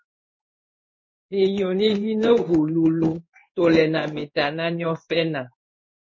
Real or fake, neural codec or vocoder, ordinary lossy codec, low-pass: fake; codec, 16 kHz in and 24 kHz out, 1 kbps, XY-Tokenizer; MP3, 32 kbps; 7.2 kHz